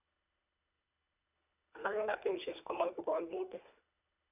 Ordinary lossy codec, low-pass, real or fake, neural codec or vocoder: none; 3.6 kHz; fake; codec, 24 kHz, 1.5 kbps, HILCodec